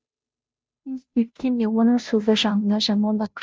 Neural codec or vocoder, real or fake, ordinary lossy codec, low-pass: codec, 16 kHz, 0.5 kbps, FunCodec, trained on Chinese and English, 25 frames a second; fake; none; none